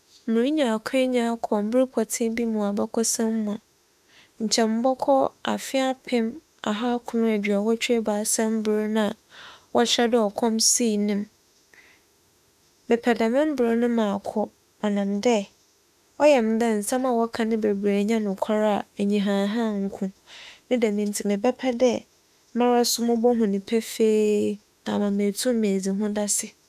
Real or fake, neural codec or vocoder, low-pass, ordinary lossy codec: fake; autoencoder, 48 kHz, 32 numbers a frame, DAC-VAE, trained on Japanese speech; 14.4 kHz; none